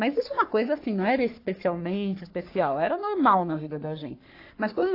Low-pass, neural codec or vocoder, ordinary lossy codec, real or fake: 5.4 kHz; codec, 44.1 kHz, 3.4 kbps, Pupu-Codec; AAC, 32 kbps; fake